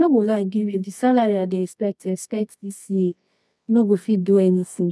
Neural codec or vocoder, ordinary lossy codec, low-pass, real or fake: codec, 24 kHz, 0.9 kbps, WavTokenizer, medium music audio release; none; none; fake